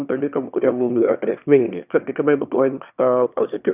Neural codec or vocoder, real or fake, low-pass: autoencoder, 22.05 kHz, a latent of 192 numbers a frame, VITS, trained on one speaker; fake; 3.6 kHz